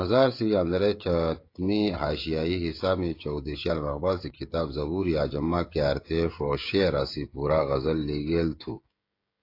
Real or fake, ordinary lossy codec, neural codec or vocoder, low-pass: fake; AAC, 32 kbps; codec, 16 kHz, 16 kbps, FreqCodec, smaller model; 5.4 kHz